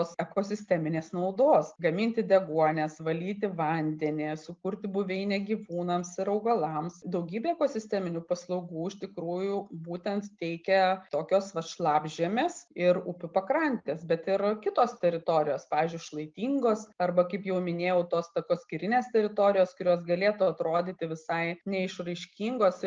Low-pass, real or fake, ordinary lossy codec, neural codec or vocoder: 7.2 kHz; real; Opus, 24 kbps; none